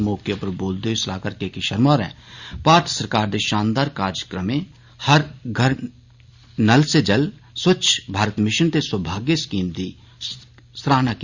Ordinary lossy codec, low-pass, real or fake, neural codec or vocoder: Opus, 64 kbps; 7.2 kHz; real; none